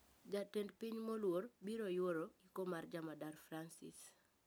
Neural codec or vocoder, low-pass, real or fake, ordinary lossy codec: none; none; real; none